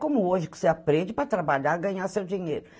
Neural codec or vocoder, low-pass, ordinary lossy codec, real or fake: none; none; none; real